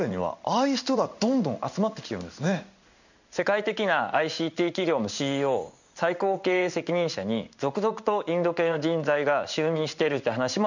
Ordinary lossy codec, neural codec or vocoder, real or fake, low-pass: none; codec, 16 kHz in and 24 kHz out, 1 kbps, XY-Tokenizer; fake; 7.2 kHz